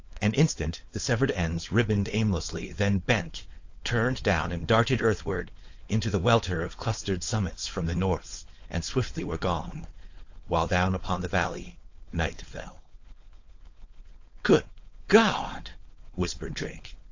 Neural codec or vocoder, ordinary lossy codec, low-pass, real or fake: codec, 16 kHz, 4.8 kbps, FACodec; AAC, 48 kbps; 7.2 kHz; fake